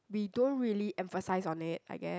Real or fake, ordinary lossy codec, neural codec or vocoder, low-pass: real; none; none; none